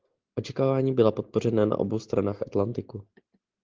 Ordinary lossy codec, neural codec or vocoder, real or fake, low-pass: Opus, 16 kbps; none; real; 7.2 kHz